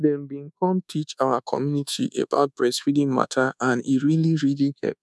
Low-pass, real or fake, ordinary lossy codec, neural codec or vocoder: none; fake; none; codec, 24 kHz, 1.2 kbps, DualCodec